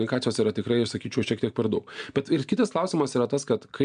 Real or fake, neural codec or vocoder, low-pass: real; none; 9.9 kHz